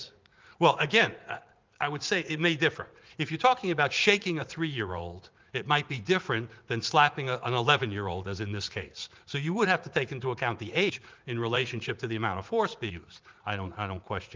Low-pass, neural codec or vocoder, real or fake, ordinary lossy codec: 7.2 kHz; vocoder, 44.1 kHz, 80 mel bands, Vocos; fake; Opus, 32 kbps